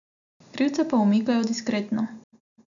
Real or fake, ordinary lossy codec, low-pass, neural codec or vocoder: real; none; 7.2 kHz; none